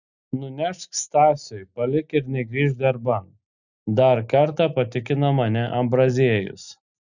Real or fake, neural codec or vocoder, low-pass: real; none; 7.2 kHz